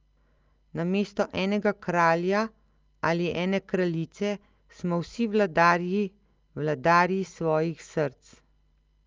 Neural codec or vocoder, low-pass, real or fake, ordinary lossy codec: none; 7.2 kHz; real; Opus, 24 kbps